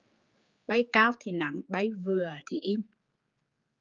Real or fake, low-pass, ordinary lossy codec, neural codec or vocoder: fake; 7.2 kHz; Opus, 32 kbps; codec, 16 kHz, 4 kbps, X-Codec, HuBERT features, trained on general audio